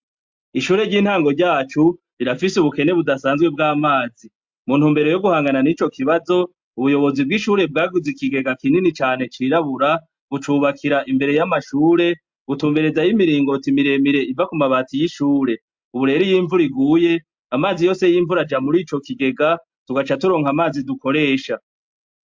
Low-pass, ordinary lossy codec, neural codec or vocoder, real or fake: 7.2 kHz; MP3, 64 kbps; none; real